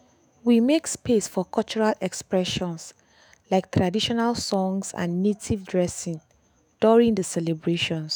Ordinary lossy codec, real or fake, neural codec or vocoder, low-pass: none; fake; autoencoder, 48 kHz, 128 numbers a frame, DAC-VAE, trained on Japanese speech; none